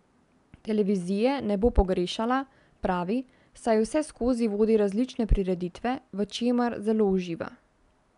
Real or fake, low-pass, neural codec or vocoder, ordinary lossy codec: real; 10.8 kHz; none; MP3, 96 kbps